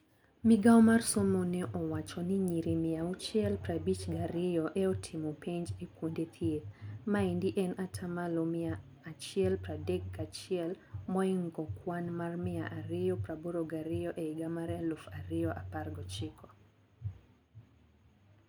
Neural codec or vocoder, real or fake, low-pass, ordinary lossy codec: none; real; none; none